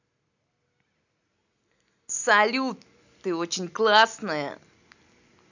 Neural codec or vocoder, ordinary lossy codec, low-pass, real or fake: none; none; 7.2 kHz; real